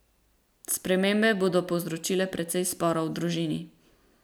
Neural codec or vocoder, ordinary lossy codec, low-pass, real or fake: none; none; none; real